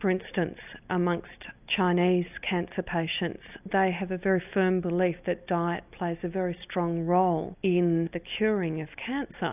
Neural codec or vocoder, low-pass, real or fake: none; 3.6 kHz; real